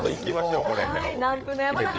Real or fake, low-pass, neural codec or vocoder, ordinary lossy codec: fake; none; codec, 16 kHz, 8 kbps, FreqCodec, larger model; none